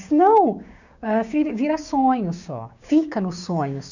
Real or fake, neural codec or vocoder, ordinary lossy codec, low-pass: fake; codec, 16 kHz, 6 kbps, DAC; none; 7.2 kHz